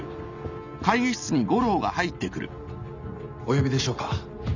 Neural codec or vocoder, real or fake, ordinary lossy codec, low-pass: none; real; none; 7.2 kHz